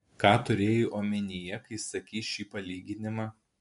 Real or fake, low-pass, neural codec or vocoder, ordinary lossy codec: real; 10.8 kHz; none; MP3, 64 kbps